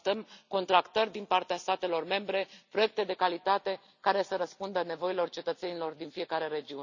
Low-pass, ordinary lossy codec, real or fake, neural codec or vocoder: 7.2 kHz; none; real; none